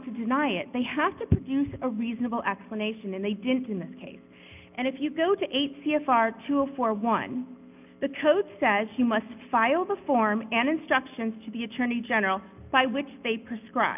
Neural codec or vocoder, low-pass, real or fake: none; 3.6 kHz; real